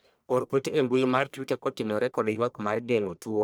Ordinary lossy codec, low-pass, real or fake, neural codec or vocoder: none; none; fake; codec, 44.1 kHz, 1.7 kbps, Pupu-Codec